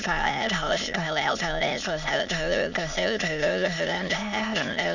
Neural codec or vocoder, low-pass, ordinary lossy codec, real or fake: autoencoder, 22.05 kHz, a latent of 192 numbers a frame, VITS, trained on many speakers; 7.2 kHz; none; fake